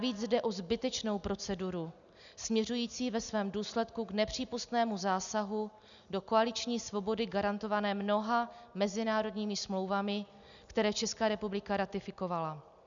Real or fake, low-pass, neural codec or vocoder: real; 7.2 kHz; none